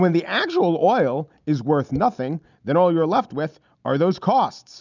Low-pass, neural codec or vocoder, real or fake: 7.2 kHz; none; real